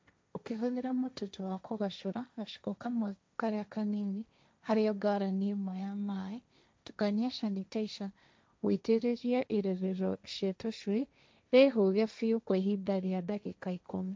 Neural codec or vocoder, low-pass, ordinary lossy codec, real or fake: codec, 16 kHz, 1.1 kbps, Voila-Tokenizer; none; none; fake